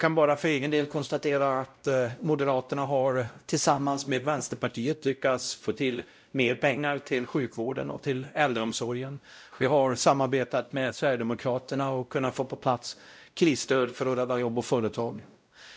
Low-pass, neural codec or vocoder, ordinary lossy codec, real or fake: none; codec, 16 kHz, 0.5 kbps, X-Codec, WavLM features, trained on Multilingual LibriSpeech; none; fake